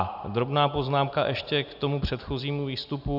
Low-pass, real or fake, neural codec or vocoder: 5.4 kHz; real; none